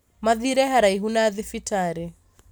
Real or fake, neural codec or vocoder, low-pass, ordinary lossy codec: real; none; none; none